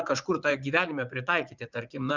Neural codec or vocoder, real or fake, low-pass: vocoder, 44.1 kHz, 80 mel bands, Vocos; fake; 7.2 kHz